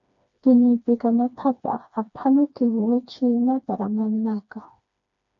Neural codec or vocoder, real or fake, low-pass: codec, 16 kHz, 2 kbps, FreqCodec, smaller model; fake; 7.2 kHz